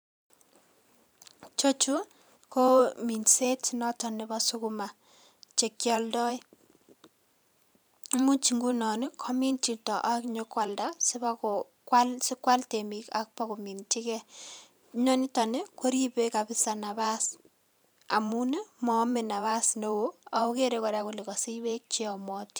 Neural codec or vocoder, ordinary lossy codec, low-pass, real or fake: vocoder, 44.1 kHz, 128 mel bands every 512 samples, BigVGAN v2; none; none; fake